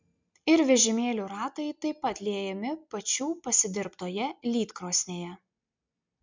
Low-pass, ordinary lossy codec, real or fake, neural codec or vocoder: 7.2 kHz; MP3, 64 kbps; real; none